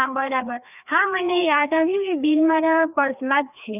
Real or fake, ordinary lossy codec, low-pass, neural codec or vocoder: fake; none; 3.6 kHz; codec, 16 kHz, 2 kbps, FreqCodec, larger model